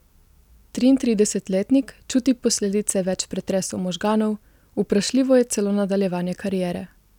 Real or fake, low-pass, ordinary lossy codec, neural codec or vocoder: real; 19.8 kHz; none; none